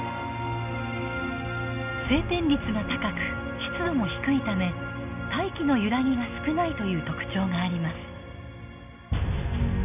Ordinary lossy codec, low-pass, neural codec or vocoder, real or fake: none; 3.6 kHz; none; real